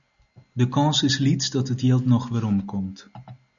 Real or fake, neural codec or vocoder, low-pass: real; none; 7.2 kHz